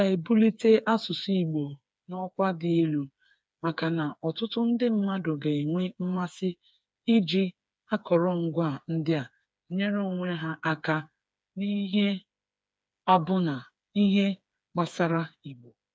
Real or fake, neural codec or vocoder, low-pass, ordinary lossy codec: fake; codec, 16 kHz, 4 kbps, FreqCodec, smaller model; none; none